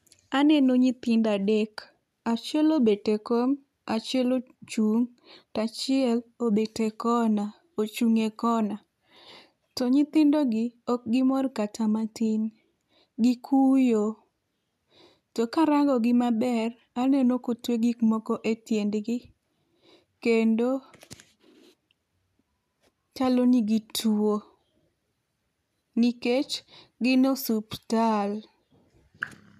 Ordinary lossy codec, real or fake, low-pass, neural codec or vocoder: none; real; 14.4 kHz; none